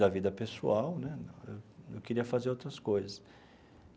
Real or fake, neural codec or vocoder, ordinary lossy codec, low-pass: real; none; none; none